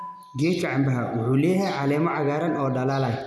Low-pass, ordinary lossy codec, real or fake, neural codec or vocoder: none; none; real; none